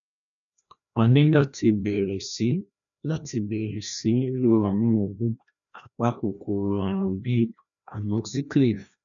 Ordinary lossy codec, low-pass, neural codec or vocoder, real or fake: none; 7.2 kHz; codec, 16 kHz, 1 kbps, FreqCodec, larger model; fake